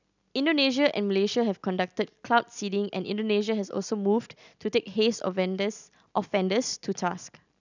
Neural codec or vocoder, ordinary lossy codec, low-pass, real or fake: none; none; 7.2 kHz; real